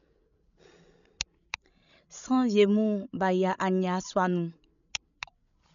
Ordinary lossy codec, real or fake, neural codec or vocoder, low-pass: none; fake; codec, 16 kHz, 16 kbps, FreqCodec, larger model; 7.2 kHz